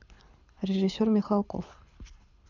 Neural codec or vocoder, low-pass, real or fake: codec, 24 kHz, 6 kbps, HILCodec; 7.2 kHz; fake